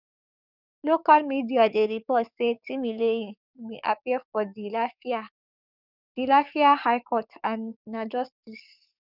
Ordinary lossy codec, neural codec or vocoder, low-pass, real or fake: none; codec, 44.1 kHz, 7.8 kbps, DAC; 5.4 kHz; fake